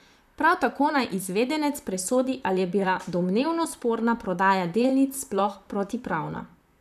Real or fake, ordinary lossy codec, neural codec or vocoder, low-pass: fake; none; vocoder, 44.1 kHz, 128 mel bands, Pupu-Vocoder; 14.4 kHz